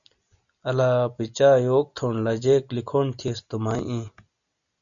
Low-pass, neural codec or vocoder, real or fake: 7.2 kHz; none; real